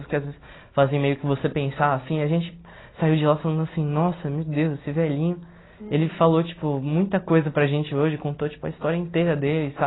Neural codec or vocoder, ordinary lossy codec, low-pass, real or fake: none; AAC, 16 kbps; 7.2 kHz; real